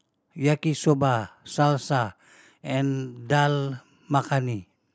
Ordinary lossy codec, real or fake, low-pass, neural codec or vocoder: none; real; none; none